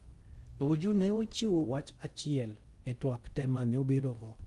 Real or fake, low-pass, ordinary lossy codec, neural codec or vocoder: fake; 10.8 kHz; Opus, 32 kbps; codec, 16 kHz in and 24 kHz out, 0.6 kbps, FocalCodec, streaming, 2048 codes